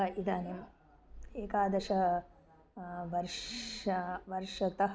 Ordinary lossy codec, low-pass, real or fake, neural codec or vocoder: none; none; real; none